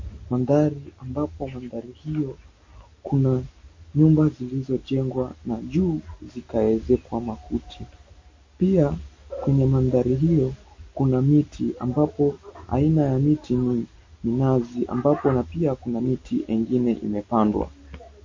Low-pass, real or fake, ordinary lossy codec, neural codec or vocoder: 7.2 kHz; real; MP3, 32 kbps; none